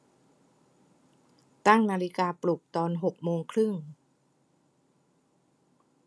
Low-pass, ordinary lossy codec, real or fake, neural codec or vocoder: none; none; real; none